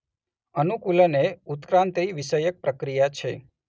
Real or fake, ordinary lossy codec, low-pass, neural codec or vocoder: real; none; none; none